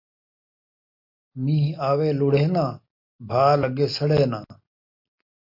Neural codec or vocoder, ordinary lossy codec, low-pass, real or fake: none; MP3, 32 kbps; 5.4 kHz; real